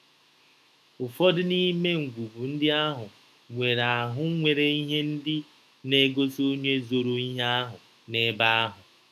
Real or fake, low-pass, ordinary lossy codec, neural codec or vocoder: fake; 14.4 kHz; none; autoencoder, 48 kHz, 128 numbers a frame, DAC-VAE, trained on Japanese speech